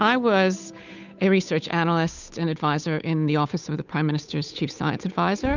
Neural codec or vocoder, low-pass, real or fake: codec, 16 kHz, 8 kbps, FunCodec, trained on Chinese and English, 25 frames a second; 7.2 kHz; fake